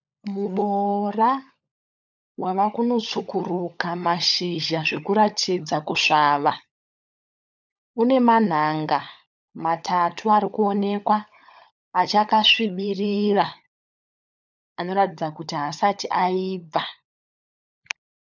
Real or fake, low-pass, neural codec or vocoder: fake; 7.2 kHz; codec, 16 kHz, 16 kbps, FunCodec, trained on LibriTTS, 50 frames a second